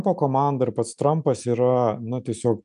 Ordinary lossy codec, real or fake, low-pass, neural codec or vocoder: MP3, 96 kbps; real; 10.8 kHz; none